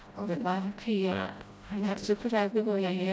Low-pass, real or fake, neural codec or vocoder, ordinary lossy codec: none; fake; codec, 16 kHz, 0.5 kbps, FreqCodec, smaller model; none